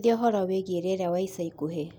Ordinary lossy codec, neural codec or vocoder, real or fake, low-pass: none; none; real; 19.8 kHz